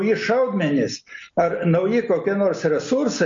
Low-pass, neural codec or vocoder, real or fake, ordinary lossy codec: 7.2 kHz; none; real; MP3, 48 kbps